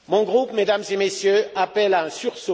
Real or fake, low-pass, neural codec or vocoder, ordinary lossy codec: real; none; none; none